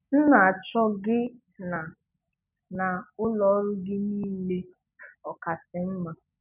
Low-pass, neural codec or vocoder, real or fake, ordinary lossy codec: 3.6 kHz; none; real; none